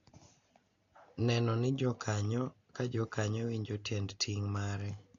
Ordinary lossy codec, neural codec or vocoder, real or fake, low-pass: AAC, 48 kbps; none; real; 7.2 kHz